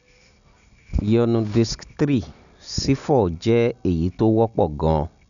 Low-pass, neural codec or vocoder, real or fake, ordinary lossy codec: 7.2 kHz; none; real; none